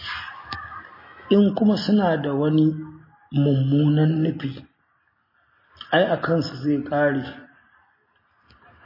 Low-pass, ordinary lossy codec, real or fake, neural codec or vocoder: 5.4 kHz; MP3, 24 kbps; real; none